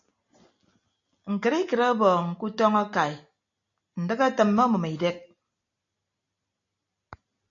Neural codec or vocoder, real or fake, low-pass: none; real; 7.2 kHz